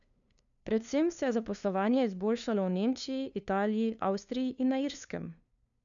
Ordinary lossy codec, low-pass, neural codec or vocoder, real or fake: none; 7.2 kHz; codec, 16 kHz, 2 kbps, FunCodec, trained on LibriTTS, 25 frames a second; fake